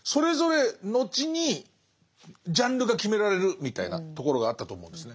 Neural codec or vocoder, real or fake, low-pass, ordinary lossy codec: none; real; none; none